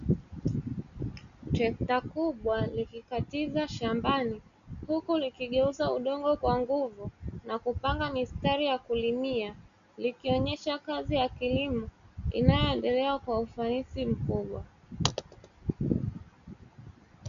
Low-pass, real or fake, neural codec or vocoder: 7.2 kHz; real; none